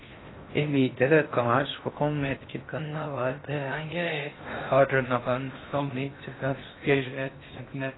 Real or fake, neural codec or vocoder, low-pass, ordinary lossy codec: fake; codec, 16 kHz in and 24 kHz out, 0.6 kbps, FocalCodec, streaming, 4096 codes; 7.2 kHz; AAC, 16 kbps